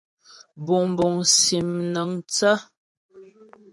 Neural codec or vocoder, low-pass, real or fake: vocoder, 24 kHz, 100 mel bands, Vocos; 10.8 kHz; fake